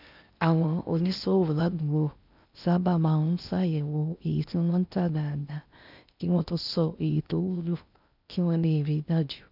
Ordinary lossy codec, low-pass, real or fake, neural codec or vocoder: none; 5.4 kHz; fake; codec, 16 kHz in and 24 kHz out, 0.6 kbps, FocalCodec, streaming, 2048 codes